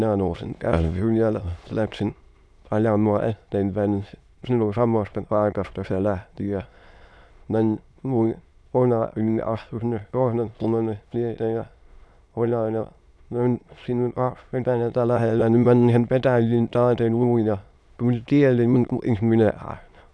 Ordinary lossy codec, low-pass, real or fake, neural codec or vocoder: none; none; fake; autoencoder, 22.05 kHz, a latent of 192 numbers a frame, VITS, trained on many speakers